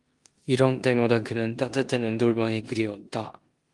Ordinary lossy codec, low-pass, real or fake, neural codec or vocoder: Opus, 24 kbps; 10.8 kHz; fake; codec, 16 kHz in and 24 kHz out, 0.9 kbps, LongCat-Audio-Codec, four codebook decoder